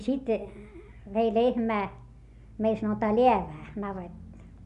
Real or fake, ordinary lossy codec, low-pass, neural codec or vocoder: real; none; 10.8 kHz; none